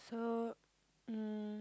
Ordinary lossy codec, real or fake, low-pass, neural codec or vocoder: none; real; none; none